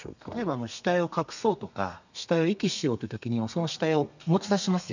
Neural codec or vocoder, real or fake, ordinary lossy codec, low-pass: codec, 32 kHz, 1.9 kbps, SNAC; fake; none; 7.2 kHz